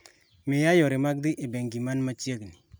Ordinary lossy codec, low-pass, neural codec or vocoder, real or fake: none; none; none; real